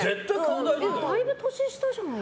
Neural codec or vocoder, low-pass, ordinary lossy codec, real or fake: none; none; none; real